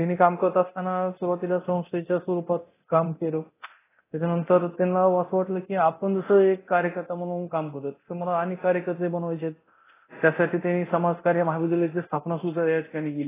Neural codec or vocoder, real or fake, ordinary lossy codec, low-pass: codec, 24 kHz, 0.9 kbps, DualCodec; fake; AAC, 16 kbps; 3.6 kHz